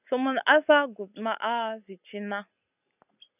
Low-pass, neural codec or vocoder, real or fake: 3.6 kHz; none; real